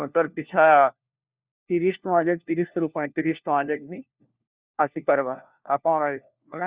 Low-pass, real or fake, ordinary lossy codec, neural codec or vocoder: 3.6 kHz; fake; Opus, 64 kbps; codec, 16 kHz, 1 kbps, FunCodec, trained on LibriTTS, 50 frames a second